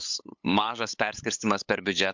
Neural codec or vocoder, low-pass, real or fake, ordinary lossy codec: codec, 16 kHz, 8 kbps, FunCodec, trained on LibriTTS, 25 frames a second; 7.2 kHz; fake; MP3, 64 kbps